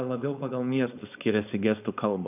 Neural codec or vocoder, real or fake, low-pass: codec, 16 kHz, 4.8 kbps, FACodec; fake; 3.6 kHz